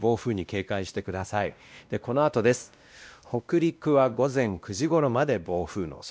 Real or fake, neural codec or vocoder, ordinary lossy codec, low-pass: fake; codec, 16 kHz, 1 kbps, X-Codec, WavLM features, trained on Multilingual LibriSpeech; none; none